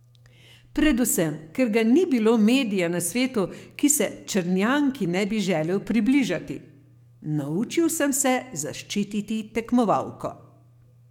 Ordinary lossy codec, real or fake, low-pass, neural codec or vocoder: MP3, 96 kbps; fake; 19.8 kHz; codec, 44.1 kHz, 7.8 kbps, DAC